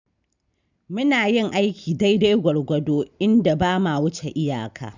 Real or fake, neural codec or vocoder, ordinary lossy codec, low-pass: real; none; none; 7.2 kHz